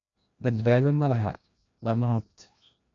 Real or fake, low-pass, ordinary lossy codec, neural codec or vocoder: fake; 7.2 kHz; MP3, 48 kbps; codec, 16 kHz, 1 kbps, FreqCodec, larger model